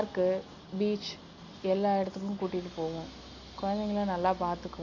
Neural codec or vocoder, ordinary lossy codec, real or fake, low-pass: none; Opus, 64 kbps; real; 7.2 kHz